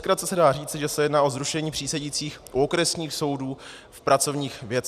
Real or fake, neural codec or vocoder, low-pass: real; none; 14.4 kHz